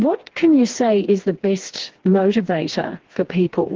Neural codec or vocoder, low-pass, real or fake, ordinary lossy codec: codec, 16 kHz, 2 kbps, FreqCodec, smaller model; 7.2 kHz; fake; Opus, 16 kbps